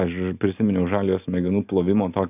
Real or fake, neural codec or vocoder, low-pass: real; none; 3.6 kHz